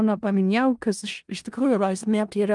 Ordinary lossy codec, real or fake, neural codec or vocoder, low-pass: Opus, 32 kbps; fake; codec, 16 kHz in and 24 kHz out, 0.4 kbps, LongCat-Audio-Codec, four codebook decoder; 10.8 kHz